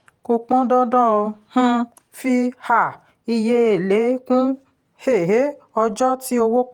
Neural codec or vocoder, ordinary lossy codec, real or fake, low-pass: vocoder, 48 kHz, 128 mel bands, Vocos; Opus, 32 kbps; fake; 19.8 kHz